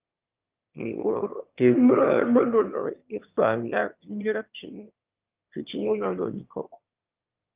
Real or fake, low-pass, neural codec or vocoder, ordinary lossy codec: fake; 3.6 kHz; autoencoder, 22.05 kHz, a latent of 192 numbers a frame, VITS, trained on one speaker; Opus, 32 kbps